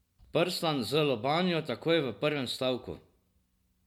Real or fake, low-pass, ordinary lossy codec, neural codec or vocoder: real; 19.8 kHz; MP3, 96 kbps; none